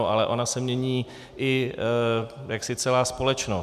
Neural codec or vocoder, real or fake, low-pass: none; real; 14.4 kHz